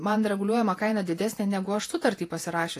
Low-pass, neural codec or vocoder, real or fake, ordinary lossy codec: 14.4 kHz; vocoder, 48 kHz, 128 mel bands, Vocos; fake; AAC, 48 kbps